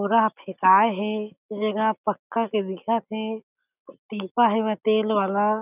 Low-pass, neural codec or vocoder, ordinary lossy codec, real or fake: 3.6 kHz; none; none; real